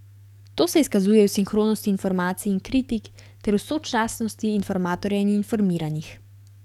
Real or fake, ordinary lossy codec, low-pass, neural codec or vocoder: fake; none; 19.8 kHz; codec, 44.1 kHz, 7.8 kbps, DAC